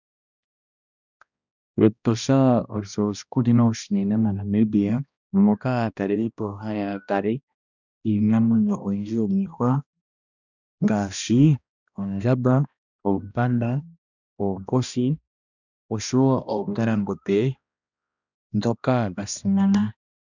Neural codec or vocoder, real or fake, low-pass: codec, 16 kHz, 1 kbps, X-Codec, HuBERT features, trained on balanced general audio; fake; 7.2 kHz